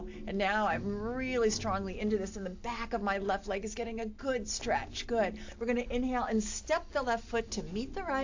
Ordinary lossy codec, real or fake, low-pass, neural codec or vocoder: AAC, 48 kbps; real; 7.2 kHz; none